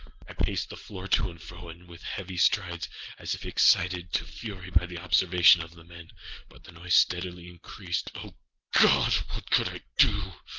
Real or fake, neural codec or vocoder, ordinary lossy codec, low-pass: real; none; Opus, 16 kbps; 7.2 kHz